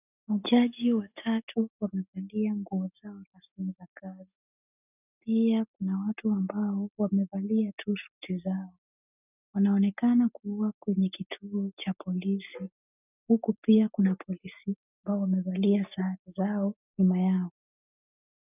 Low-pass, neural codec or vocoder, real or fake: 3.6 kHz; none; real